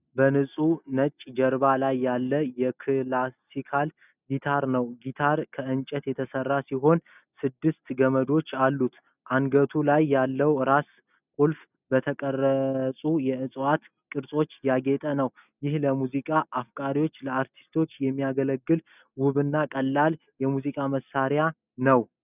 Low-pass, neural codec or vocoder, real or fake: 3.6 kHz; none; real